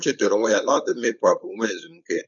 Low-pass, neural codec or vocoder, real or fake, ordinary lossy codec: 7.2 kHz; codec, 16 kHz, 4.8 kbps, FACodec; fake; none